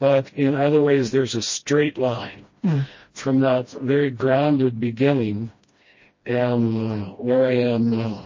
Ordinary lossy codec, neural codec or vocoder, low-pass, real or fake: MP3, 32 kbps; codec, 16 kHz, 1 kbps, FreqCodec, smaller model; 7.2 kHz; fake